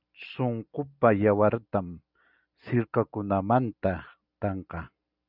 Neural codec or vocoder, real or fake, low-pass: none; real; 5.4 kHz